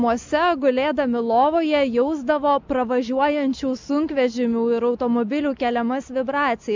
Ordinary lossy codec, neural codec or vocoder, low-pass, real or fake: MP3, 64 kbps; none; 7.2 kHz; real